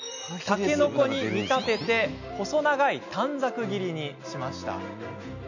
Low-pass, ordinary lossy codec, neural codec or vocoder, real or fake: 7.2 kHz; none; none; real